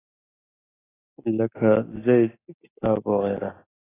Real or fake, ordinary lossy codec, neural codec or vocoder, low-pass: real; AAC, 16 kbps; none; 3.6 kHz